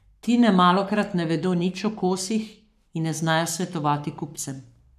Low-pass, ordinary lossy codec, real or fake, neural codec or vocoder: 14.4 kHz; none; fake; codec, 44.1 kHz, 7.8 kbps, Pupu-Codec